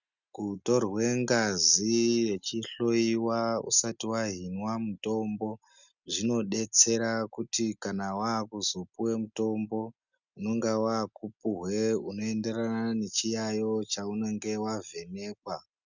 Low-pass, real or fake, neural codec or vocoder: 7.2 kHz; real; none